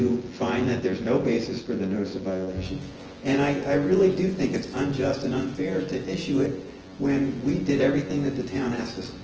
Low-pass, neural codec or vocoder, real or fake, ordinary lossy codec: 7.2 kHz; vocoder, 24 kHz, 100 mel bands, Vocos; fake; Opus, 16 kbps